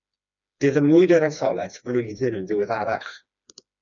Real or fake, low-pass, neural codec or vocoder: fake; 7.2 kHz; codec, 16 kHz, 2 kbps, FreqCodec, smaller model